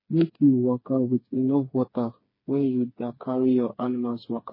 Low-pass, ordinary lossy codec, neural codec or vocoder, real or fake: 5.4 kHz; MP3, 24 kbps; codec, 16 kHz, 4 kbps, FreqCodec, smaller model; fake